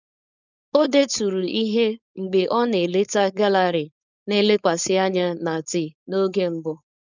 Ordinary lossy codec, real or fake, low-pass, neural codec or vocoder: none; fake; 7.2 kHz; codec, 16 kHz, 4.8 kbps, FACodec